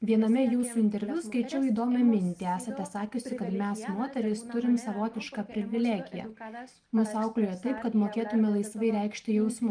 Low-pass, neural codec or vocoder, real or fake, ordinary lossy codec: 9.9 kHz; none; real; Opus, 32 kbps